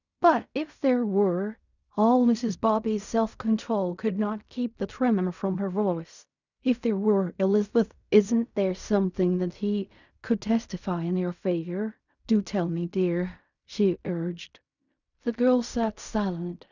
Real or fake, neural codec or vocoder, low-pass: fake; codec, 16 kHz in and 24 kHz out, 0.4 kbps, LongCat-Audio-Codec, fine tuned four codebook decoder; 7.2 kHz